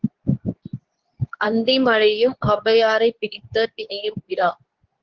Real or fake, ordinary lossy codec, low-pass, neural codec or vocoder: fake; Opus, 16 kbps; 7.2 kHz; codec, 24 kHz, 0.9 kbps, WavTokenizer, medium speech release version 2